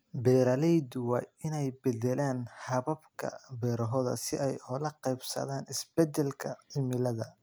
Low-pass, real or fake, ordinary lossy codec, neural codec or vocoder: none; real; none; none